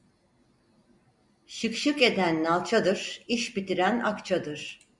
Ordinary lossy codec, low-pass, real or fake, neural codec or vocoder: Opus, 64 kbps; 10.8 kHz; real; none